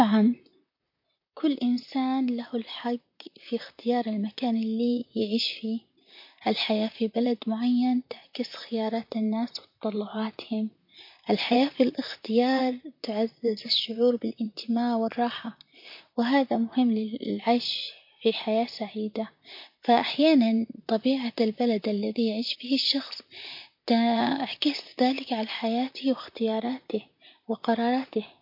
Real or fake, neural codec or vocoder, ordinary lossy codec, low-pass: fake; vocoder, 44.1 kHz, 128 mel bands, Pupu-Vocoder; MP3, 32 kbps; 5.4 kHz